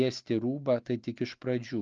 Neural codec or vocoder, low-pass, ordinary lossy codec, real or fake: none; 7.2 kHz; Opus, 24 kbps; real